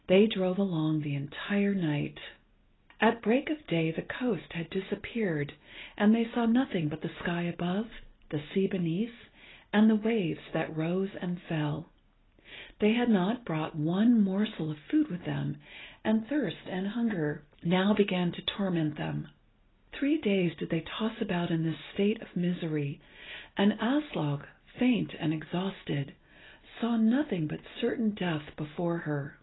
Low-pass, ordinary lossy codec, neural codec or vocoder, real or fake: 7.2 kHz; AAC, 16 kbps; none; real